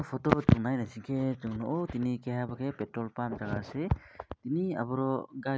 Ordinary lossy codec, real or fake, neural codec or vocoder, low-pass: none; real; none; none